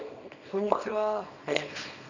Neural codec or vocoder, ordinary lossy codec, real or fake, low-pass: codec, 24 kHz, 0.9 kbps, WavTokenizer, small release; none; fake; 7.2 kHz